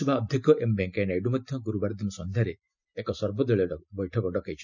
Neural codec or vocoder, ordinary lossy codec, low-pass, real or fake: none; none; 7.2 kHz; real